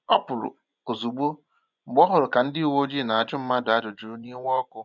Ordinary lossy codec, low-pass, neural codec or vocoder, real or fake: none; 7.2 kHz; vocoder, 24 kHz, 100 mel bands, Vocos; fake